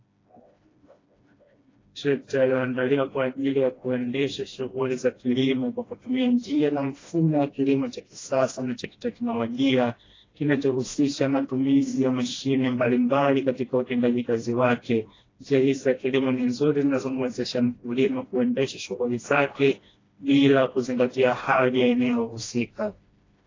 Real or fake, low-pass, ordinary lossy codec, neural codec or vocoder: fake; 7.2 kHz; AAC, 32 kbps; codec, 16 kHz, 1 kbps, FreqCodec, smaller model